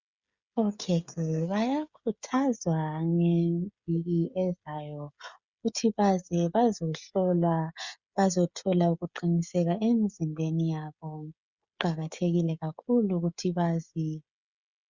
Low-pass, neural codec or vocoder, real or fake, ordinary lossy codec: 7.2 kHz; codec, 16 kHz, 16 kbps, FreqCodec, smaller model; fake; Opus, 64 kbps